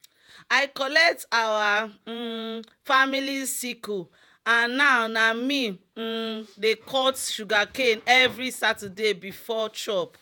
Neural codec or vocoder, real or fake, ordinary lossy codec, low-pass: vocoder, 48 kHz, 128 mel bands, Vocos; fake; none; none